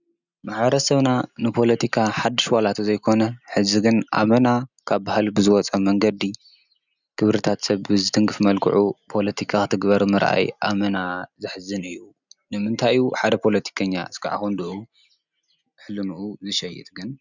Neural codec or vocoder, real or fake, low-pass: none; real; 7.2 kHz